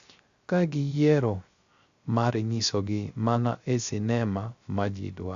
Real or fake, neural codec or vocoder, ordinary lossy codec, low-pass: fake; codec, 16 kHz, 0.3 kbps, FocalCodec; Opus, 64 kbps; 7.2 kHz